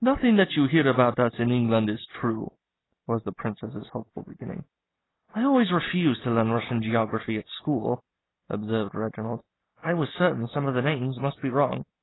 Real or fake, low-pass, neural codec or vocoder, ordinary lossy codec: real; 7.2 kHz; none; AAC, 16 kbps